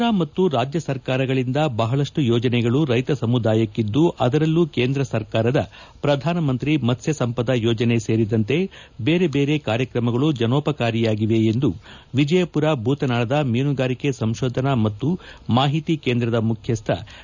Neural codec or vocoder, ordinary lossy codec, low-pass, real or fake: none; none; 7.2 kHz; real